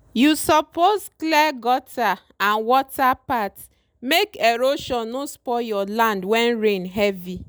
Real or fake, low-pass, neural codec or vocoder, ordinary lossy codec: real; none; none; none